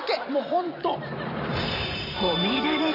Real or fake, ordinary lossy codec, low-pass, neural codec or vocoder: fake; none; 5.4 kHz; vocoder, 44.1 kHz, 128 mel bands every 512 samples, BigVGAN v2